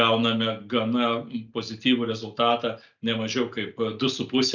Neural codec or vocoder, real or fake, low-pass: none; real; 7.2 kHz